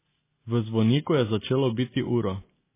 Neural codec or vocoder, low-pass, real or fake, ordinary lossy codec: none; 3.6 kHz; real; MP3, 16 kbps